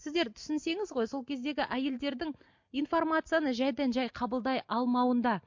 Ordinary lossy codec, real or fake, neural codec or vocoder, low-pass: MP3, 48 kbps; real; none; 7.2 kHz